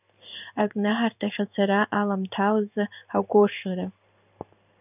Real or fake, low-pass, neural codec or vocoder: fake; 3.6 kHz; codec, 16 kHz in and 24 kHz out, 1 kbps, XY-Tokenizer